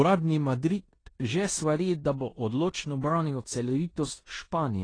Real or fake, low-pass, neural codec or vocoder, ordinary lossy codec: fake; 9.9 kHz; codec, 16 kHz in and 24 kHz out, 0.9 kbps, LongCat-Audio-Codec, four codebook decoder; AAC, 32 kbps